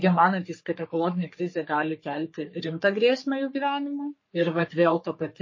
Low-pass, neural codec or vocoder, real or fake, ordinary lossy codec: 7.2 kHz; codec, 44.1 kHz, 3.4 kbps, Pupu-Codec; fake; MP3, 32 kbps